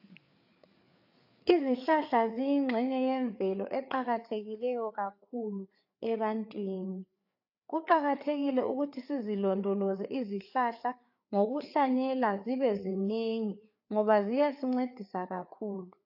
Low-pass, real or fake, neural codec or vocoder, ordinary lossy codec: 5.4 kHz; fake; codec, 16 kHz, 4 kbps, FreqCodec, larger model; AAC, 48 kbps